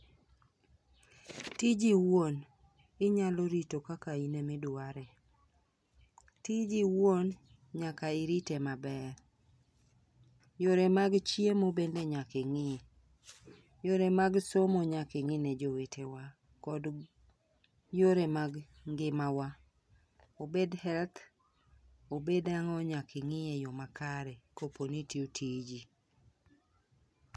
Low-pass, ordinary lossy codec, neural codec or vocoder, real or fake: none; none; none; real